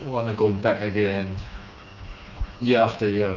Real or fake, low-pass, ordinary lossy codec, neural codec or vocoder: fake; 7.2 kHz; none; codec, 16 kHz, 2 kbps, FreqCodec, smaller model